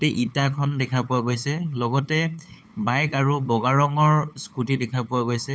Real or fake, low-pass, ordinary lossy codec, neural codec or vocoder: fake; none; none; codec, 16 kHz, 8 kbps, FunCodec, trained on LibriTTS, 25 frames a second